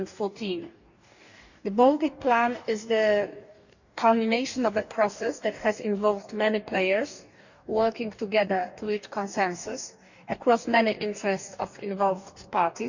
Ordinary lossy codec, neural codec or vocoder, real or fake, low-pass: none; codec, 44.1 kHz, 2.6 kbps, DAC; fake; 7.2 kHz